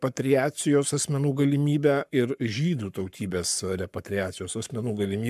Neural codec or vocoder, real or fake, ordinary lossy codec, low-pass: codec, 44.1 kHz, 7.8 kbps, Pupu-Codec; fake; MP3, 96 kbps; 14.4 kHz